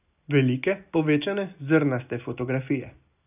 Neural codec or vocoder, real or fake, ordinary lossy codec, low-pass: none; real; none; 3.6 kHz